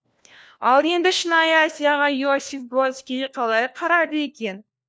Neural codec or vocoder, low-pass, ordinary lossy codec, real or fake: codec, 16 kHz, 1 kbps, FunCodec, trained on LibriTTS, 50 frames a second; none; none; fake